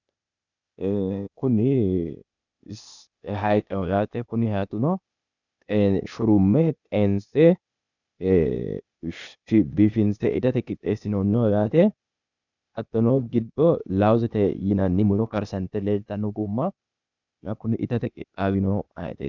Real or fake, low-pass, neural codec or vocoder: fake; 7.2 kHz; codec, 16 kHz, 0.8 kbps, ZipCodec